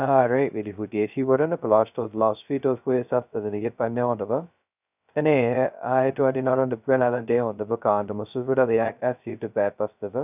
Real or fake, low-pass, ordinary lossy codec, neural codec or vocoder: fake; 3.6 kHz; none; codec, 16 kHz, 0.2 kbps, FocalCodec